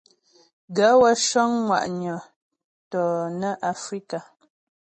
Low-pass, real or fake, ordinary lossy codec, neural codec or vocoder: 10.8 kHz; real; MP3, 32 kbps; none